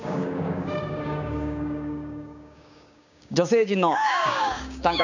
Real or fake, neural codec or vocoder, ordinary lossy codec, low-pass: fake; codec, 16 kHz, 6 kbps, DAC; none; 7.2 kHz